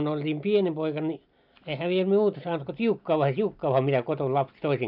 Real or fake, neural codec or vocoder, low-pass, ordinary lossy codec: real; none; 5.4 kHz; none